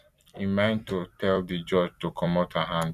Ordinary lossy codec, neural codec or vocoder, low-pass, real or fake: none; vocoder, 44.1 kHz, 128 mel bands every 256 samples, BigVGAN v2; 14.4 kHz; fake